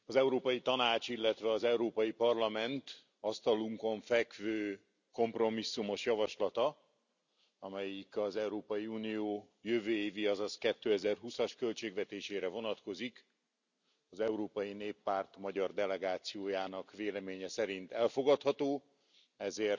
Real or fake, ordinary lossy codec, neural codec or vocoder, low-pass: real; none; none; 7.2 kHz